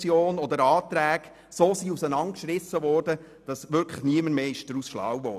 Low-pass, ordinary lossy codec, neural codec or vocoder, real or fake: 14.4 kHz; none; none; real